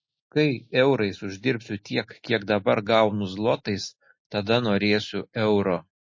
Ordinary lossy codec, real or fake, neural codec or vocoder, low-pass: MP3, 32 kbps; real; none; 7.2 kHz